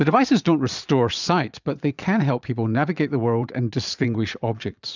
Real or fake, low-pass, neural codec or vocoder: fake; 7.2 kHz; vocoder, 22.05 kHz, 80 mel bands, Vocos